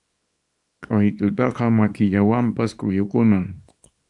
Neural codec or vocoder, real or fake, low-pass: codec, 24 kHz, 0.9 kbps, WavTokenizer, small release; fake; 10.8 kHz